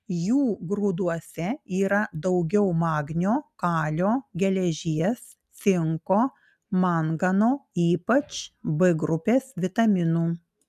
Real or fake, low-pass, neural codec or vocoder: real; 14.4 kHz; none